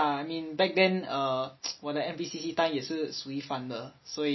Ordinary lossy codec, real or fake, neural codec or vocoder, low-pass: MP3, 24 kbps; real; none; 7.2 kHz